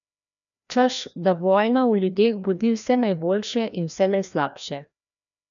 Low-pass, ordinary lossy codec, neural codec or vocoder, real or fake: 7.2 kHz; none; codec, 16 kHz, 1 kbps, FreqCodec, larger model; fake